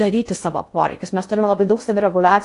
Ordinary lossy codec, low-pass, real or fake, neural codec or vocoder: AAC, 48 kbps; 10.8 kHz; fake; codec, 16 kHz in and 24 kHz out, 0.6 kbps, FocalCodec, streaming, 2048 codes